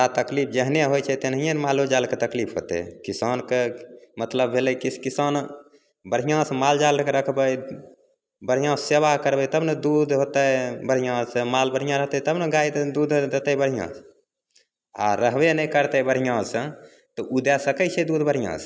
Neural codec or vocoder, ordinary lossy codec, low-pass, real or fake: none; none; none; real